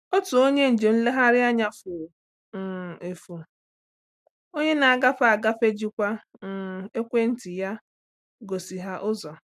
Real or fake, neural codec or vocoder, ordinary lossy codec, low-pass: real; none; AAC, 96 kbps; 14.4 kHz